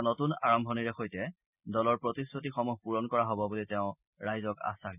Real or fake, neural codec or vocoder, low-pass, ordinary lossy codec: real; none; 3.6 kHz; none